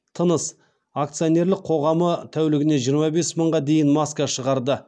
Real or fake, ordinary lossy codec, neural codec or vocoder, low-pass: real; none; none; none